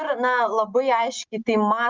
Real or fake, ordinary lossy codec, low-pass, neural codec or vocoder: real; Opus, 24 kbps; 7.2 kHz; none